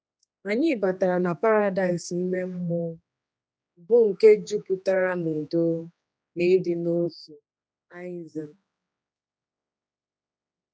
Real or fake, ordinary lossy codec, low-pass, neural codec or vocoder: fake; none; none; codec, 16 kHz, 2 kbps, X-Codec, HuBERT features, trained on general audio